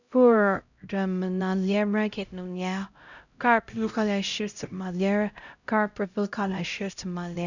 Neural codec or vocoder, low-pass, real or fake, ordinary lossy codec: codec, 16 kHz, 0.5 kbps, X-Codec, HuBERT features, trained on LibriSpeech; 7.2 kHz; fake; none